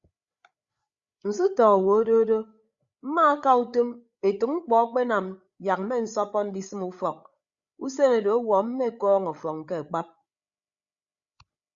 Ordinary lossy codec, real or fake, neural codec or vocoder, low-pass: Opus, 64 kbps; fake; codec, 16 kHz, 8 kbps, FreqCodec, larger model; 7.2 kHz